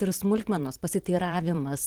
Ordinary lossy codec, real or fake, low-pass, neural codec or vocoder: Opus, 16 kbps; fake; 19.8 kHz; vocoder, 44.1 kHz, 128 mel bands every 512 samples, BigVGAN v2